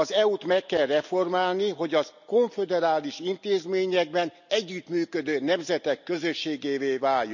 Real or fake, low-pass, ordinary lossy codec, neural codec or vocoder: real; 7.2 kHz; none; none